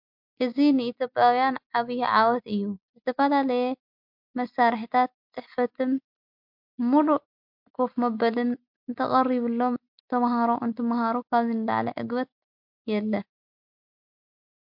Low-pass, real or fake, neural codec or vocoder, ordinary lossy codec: 5.4 kHz; real; none; MP3, 48 kbps